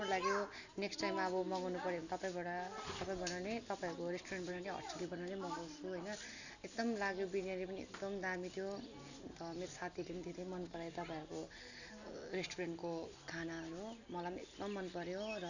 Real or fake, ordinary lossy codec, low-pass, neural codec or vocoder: real; none; 7.2 kHz; none